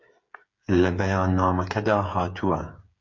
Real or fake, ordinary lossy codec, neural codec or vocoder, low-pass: fake; MP3, 64 kbps; codec, 16 kHz, 8 kbps, FreqCodec, smaller model; 7.2 kHz